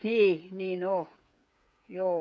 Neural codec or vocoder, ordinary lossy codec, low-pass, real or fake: codec, 16 kHz, 8 kbps, FreqCodec, smaller model; none; none; fake